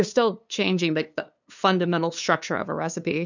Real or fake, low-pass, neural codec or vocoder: fake; 7.2 kHz; codec, 16 kHz, 2 kbps, FunCodec, trained on LibriTTS, 25 frames a second